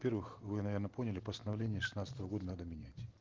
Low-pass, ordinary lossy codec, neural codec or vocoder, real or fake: 7.2 kHz; Opus, 16 kbps; none; real